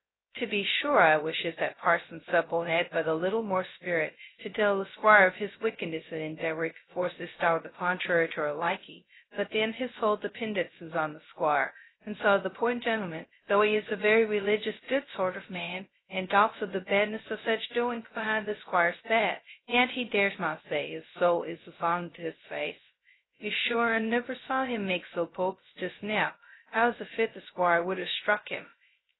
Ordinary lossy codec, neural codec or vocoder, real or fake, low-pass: AAC, 16 kbps; codec, 16 kHz, 0.2 kbps, FocalCodec; fake; 7.2 kHz